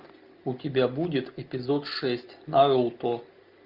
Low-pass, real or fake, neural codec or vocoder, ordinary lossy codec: 5.4 kHz; real; none; Opus, 32 kbps